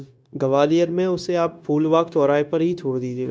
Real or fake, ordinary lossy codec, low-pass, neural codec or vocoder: fake; none; none; codec, 16 kHz, 0.9 kbps, LongCat-Audio-Codec